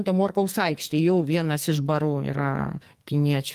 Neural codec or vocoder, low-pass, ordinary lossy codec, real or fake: codec, 44.1 kHz, 2.6 kbps, SNAC; 14.4 kHz; Opus, 32 kbps; fake